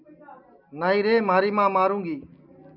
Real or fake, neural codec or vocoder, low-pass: real; none; 5.4 kHz